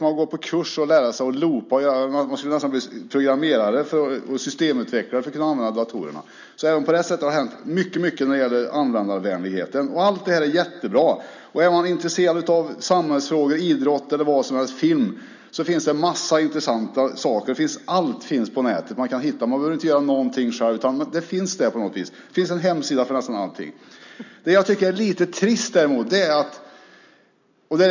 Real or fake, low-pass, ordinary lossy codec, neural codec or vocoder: real; 7.2 kHz; none; none